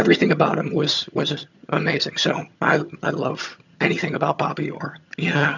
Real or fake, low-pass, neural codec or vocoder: fake; 7.2 kHz; vocoder, 22.05 kHz, 80 mel bands, HiFi-GAN